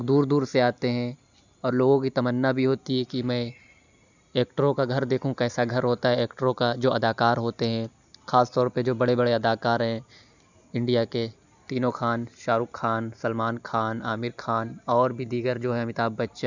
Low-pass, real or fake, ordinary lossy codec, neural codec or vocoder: 7.2 kHz; real; none; none